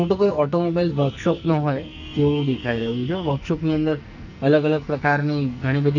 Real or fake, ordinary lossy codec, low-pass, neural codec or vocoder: fake; none; 7.2 kHz; codec, 44.1 kHz, 2.6 kbps, SNAC